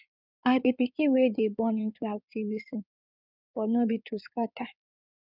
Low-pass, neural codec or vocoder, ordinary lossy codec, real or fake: 5.4 kHz; codec, 16 kHz in and 24 kHz out, 2.2 kbps, FireRedTTS-2 codec; none; fake